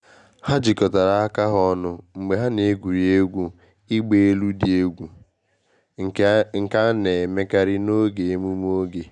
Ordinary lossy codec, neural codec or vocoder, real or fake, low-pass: none; none; real; 9.9 kHz